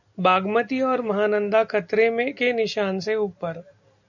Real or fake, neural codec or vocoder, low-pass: real; none; 7.2 kHz